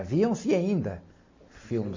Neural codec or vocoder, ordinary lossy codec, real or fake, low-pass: none; MP3, 32 kbps; real; 7.2 kHz